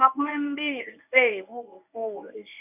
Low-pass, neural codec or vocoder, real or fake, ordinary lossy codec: 3.6 kHz; codec, 24 kHz, 0.9 kbps, WavTokenizer, medium speech release version 1; fake; none